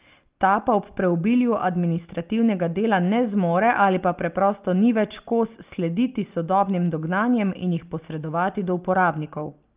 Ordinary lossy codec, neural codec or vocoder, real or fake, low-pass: Opus, 32 kbps; none; real; 3.6 kHz